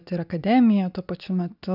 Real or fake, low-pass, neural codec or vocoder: real; 5.4 kHz; none